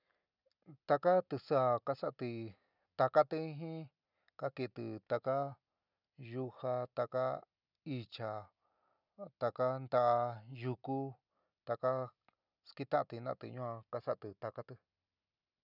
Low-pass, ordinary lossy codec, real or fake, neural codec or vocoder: 5.4 kHz; none; real; none